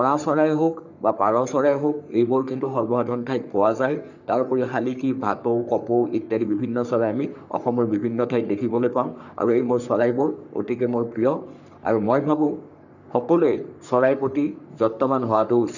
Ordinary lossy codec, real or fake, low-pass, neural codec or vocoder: none; fake; 7.2 kHz; codec, 44.1 kHz, 3.4 kbps, Pupu-Codec